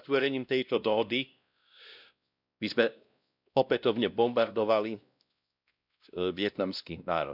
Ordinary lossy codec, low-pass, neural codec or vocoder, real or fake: none; 5.4 kHz; codec, 16 kHz, 1 kbps, X-Codec, WavLM features, trained on Multilingual LibriSpeech; fake